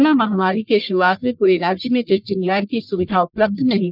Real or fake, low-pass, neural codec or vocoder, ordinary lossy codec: fake; 5.4 kHz; codec, 44.1 kHz, 1.7 kbps, Pupu-Codec; none